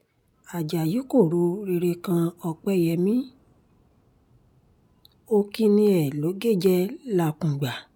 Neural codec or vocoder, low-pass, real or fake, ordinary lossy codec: none; none; real; none